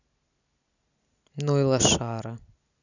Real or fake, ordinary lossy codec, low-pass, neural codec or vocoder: real; none; 7.2 kHz; none